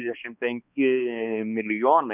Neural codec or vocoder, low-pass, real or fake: codec, 16 kHz, 2 kbps, X-Codec, HuBERT features, trained on balanced general audio; 3.6 kHz; fake